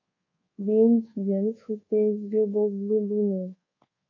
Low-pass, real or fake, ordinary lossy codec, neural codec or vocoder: 7.2 kHz; fake; MP3, 32 kbps; codec, 24 kHz, 1.2 kbps, DualCodec